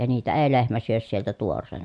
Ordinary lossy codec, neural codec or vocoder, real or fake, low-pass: MP3, 64 kbps; none; real; 10.8 kHz